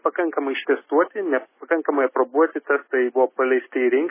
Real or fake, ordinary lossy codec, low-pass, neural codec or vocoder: real; MP3, 16 kbps; 3.6 kHz; none